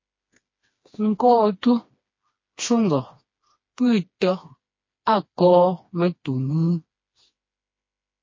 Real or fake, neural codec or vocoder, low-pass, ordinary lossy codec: fake; codec, 16 kHz, 2 kbps, FreqCodec, smaller model; 7.2 kHz; MP3, 32 kbps